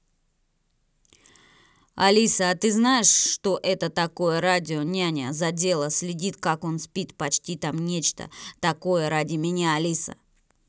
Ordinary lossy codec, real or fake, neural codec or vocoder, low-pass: none; real; none; none